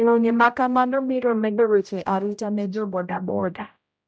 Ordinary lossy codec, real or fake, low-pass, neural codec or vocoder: none; fake; none; codec, 16 kHz, 0.5 kbps, X-Codec, HuBERT features, trained on general audio